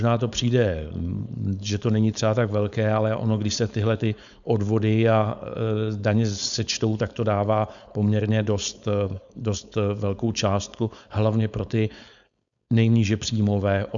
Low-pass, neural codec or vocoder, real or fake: 7.2 kHz; codec, 16 kHz, 4.8 kbps, FACodec; fake